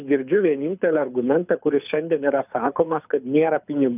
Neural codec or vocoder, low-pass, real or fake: codec, 24 kHz, 6 kbps, HILCodec; 3.6 kHz; fake